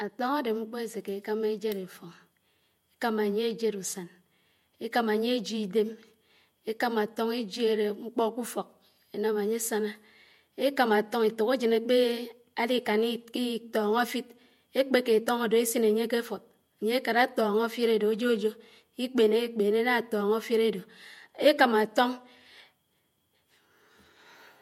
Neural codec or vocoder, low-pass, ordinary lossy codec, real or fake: vocoder, 48 kHz, 128 mel bands, Vocos; 19.8 kHz; MP3, 64 kbps; fake